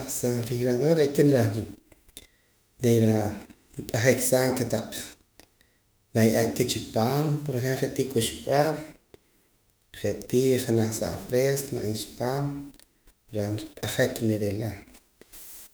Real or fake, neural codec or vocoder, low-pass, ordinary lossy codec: fake; autoencoder, 48 kHz, 32 numbers a frame, DAC-VAE, trained on Japanese speech; none; none